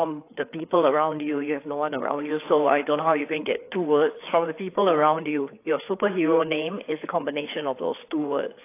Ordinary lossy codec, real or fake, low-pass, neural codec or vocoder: AAC, 24 kbps; fake; 3.6 kHz; codec, 16 kHz, 4 kbps, FreqCodec, larger model